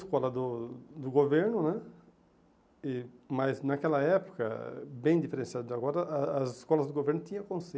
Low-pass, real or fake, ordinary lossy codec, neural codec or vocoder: none; real; none; none